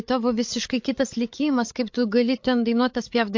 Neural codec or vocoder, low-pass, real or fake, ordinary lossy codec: codec, 16 kHz, 8 kbps, FreqCodec, larger model; 7.2 kHz; fake; MP3, 48 kbps